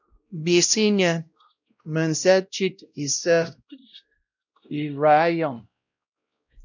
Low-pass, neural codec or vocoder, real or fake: 7.2 kHz; codec, 16 kHz, 0.5 kbps, X-Codec, WavLM features, trained on Multilingual LibriSpeech; fake